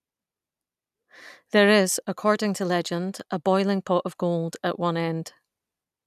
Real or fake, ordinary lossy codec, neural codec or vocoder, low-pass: real; none; none; 14.4 kHz